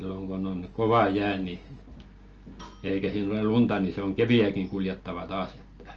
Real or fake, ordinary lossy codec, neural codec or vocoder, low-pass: real; Opus, 24 kbps; none; 7.2 kHz